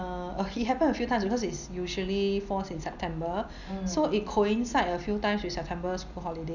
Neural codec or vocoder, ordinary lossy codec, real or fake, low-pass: none; none; real; 7.2 kHz